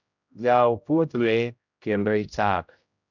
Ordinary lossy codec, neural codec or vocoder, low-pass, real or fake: none; codec, 16 kHz, 0.5 kbps, X-Codec, HuBERT features, trained on general audio; 7.2 kHz; fake